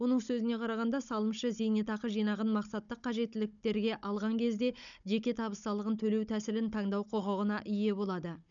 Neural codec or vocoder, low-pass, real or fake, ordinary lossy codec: codec, 16 kHz, 16 kbps, FunCodec, trained on Chinese and English, 50 frames a second; 7.2 kHz; fake; none